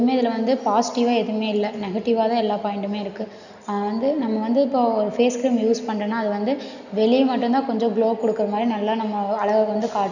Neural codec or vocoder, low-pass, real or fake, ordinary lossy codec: none; 7.2 kHz; real; none